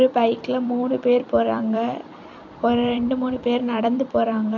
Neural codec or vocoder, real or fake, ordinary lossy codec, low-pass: vocoder, 44.1 kHz, 128 mel bands every 256 samples, BigVGAN v2; fake; none; 7.2 kHz